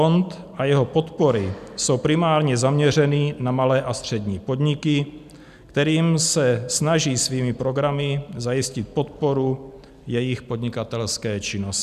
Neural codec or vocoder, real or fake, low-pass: none; real; 14.4 kHz